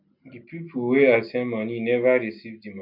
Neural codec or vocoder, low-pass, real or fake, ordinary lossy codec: none; 5.4 kHz; real; none